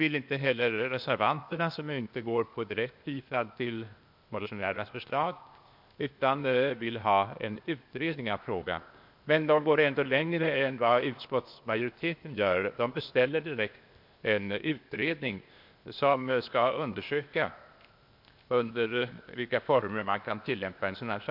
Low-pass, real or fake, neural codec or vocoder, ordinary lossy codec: 5.4 kHz; fake; codec, 16 kHz, 0.8 kbps, ZipCodec; MP3, 48 kbps